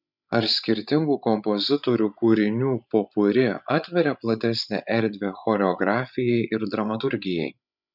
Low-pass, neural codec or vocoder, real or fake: 5.4 kHz; codec, 16 kHz, 16 kbps, FreqCodec, larger model; fake